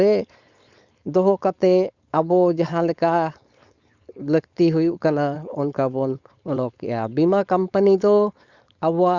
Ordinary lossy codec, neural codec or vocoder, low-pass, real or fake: Opus, 64 kbps; codec, 16 kHz, 4.8 kbps, FACodec; 7.2 kHz; fake